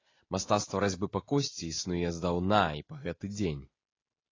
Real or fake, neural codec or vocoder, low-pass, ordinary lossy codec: real; none; 7.2 kHz; AAC, 32 kbps